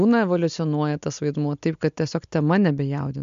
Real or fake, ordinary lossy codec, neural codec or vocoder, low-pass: real; MP3, 64 kbps; none; 7.2 kHz